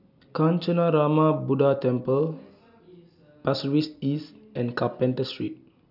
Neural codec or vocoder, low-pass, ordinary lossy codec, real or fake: none; 5.4 kHz; none; real